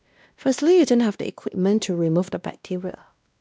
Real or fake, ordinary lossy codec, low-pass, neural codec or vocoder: fake; none; none; codec, 16 kHz, 1 kbps, X-Codec, WavLM features, trained on Multilingual LibriSpeech